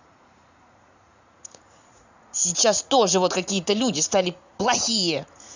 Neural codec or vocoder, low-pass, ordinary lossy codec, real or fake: vocoder, 44.1 kHz, 80 mel bands, Vocos; 7.2 kHz; Opus, 64 kbps; fake